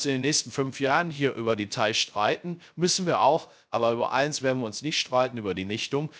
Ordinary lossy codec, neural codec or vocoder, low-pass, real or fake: none; codec, 16 kHz, 0.3 kbps, FocalCodec; none; fake